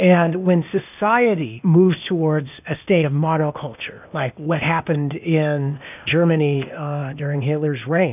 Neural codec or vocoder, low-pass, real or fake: codec, 16 kHz, 0.8 kbps, ZipCodec; 3.6 kHz; fake